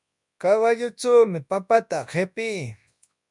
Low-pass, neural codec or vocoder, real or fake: 10.8 kHz; codec, 24 kHz, 0.9 kbps, WavTokenizer, large speech release; fake